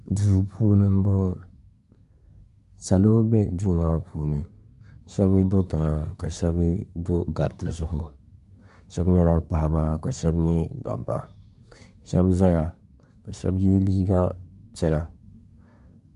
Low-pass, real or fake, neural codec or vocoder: 10.8 kHz; fake; codec, 24 kHz, 1 kbps, SNAC